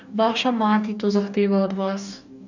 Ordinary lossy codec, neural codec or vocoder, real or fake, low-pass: none; codec, 44.1 kHz, 2.6 kbps, DAC; fake; 7.2 kHz